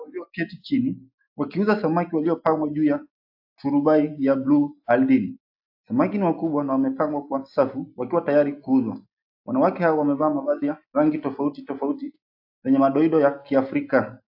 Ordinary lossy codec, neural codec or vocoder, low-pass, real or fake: AAC, 48 kbps; none; 5.4 kHz; real